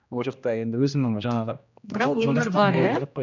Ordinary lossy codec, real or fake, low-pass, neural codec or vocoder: none; fake; 7.2 kHz; codec, 16 kHz, 1 kbps, X-Codec, HuBERT features, trained on general audio